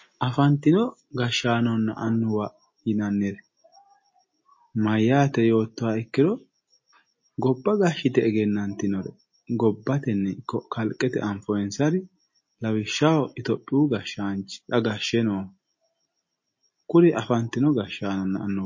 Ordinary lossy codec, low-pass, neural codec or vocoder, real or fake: MP3, 32 kbps; 7.2 kHz; none; real